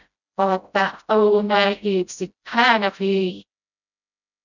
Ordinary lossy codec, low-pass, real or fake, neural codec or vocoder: none; 7.2 kHz; fake; codec, 16 kHz, 0.5 kbps, FreqCodec, smaller model